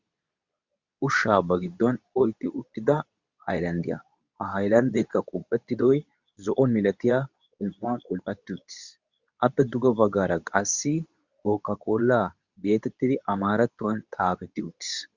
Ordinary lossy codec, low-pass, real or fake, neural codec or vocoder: Opus, 64 kbps; 7.2 kHz; fake; codec, 24 kHz, 0.9 kbps, WavTokenizer, medium speech release version 2